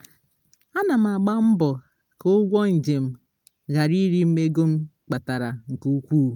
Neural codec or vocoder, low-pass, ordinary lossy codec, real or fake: none; 19.8 kHz; none; real